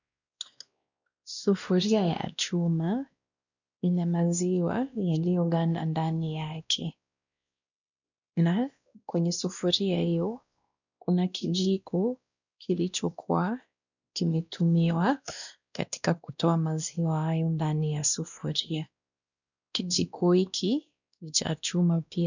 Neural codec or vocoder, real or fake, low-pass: codec, 16 kHz, 1 kbps, X-Codec, WavLM features, trained on Multilingual LibriSpeech; fake; 7.2 kHz